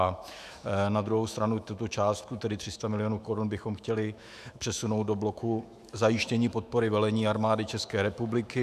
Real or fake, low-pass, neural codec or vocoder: fake; 14.4 kHz; autoencoder, 48 kHz, 128 numbers a frame, DAC-VAE, trained on Japanese speech